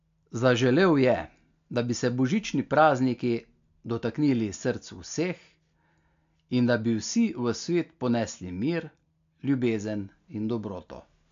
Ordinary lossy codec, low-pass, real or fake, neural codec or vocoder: AAC, 64 kbps; 7.2 kHz; real; none